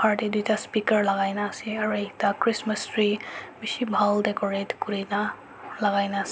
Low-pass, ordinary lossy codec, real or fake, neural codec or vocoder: none; none; real; none